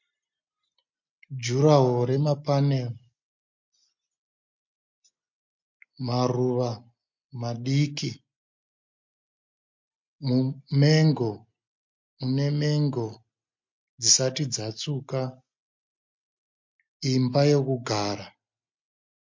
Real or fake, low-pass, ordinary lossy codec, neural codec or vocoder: real; 7.2 kHz; MP3, 48 kbps; none